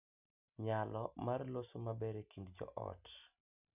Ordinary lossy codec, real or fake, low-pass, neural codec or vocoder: none; real; 3.6 kHz; none